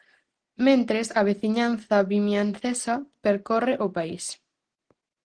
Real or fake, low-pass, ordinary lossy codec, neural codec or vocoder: real; 9.9 kHz; Opus, 16 kbps; none